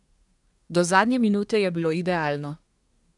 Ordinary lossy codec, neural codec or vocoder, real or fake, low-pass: none; codec, 24 kHz, 1 kbps, SNAC; fake; 10.8 kHz